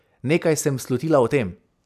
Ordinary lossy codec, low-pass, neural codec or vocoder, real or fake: none; 14.4 kHz; vocoder, 44.1 kHz, 128 mel bands every 256 samples, BigVGAN v2; fake